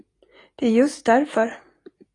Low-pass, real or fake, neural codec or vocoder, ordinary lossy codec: 10.8 kHz; real; none; AAC, 32 kbps